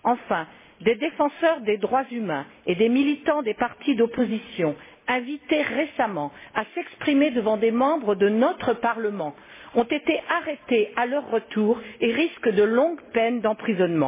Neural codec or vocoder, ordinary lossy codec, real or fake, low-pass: none; MP3, 16 kbps; real; 3.6 kHz